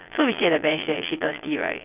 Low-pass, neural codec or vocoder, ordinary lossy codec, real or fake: 3.6 kHz; vocoder, 22.05 kHz, 80 mel bands, Vocos; none; fake